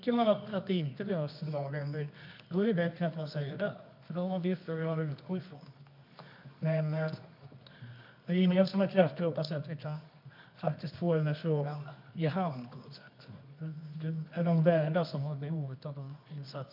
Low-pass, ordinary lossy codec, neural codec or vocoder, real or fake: 5.4 kHz; MP3, 48 kbps; codec, 24 kHz, 0.9 kbps, WavTokenizer, medium music audio release; fake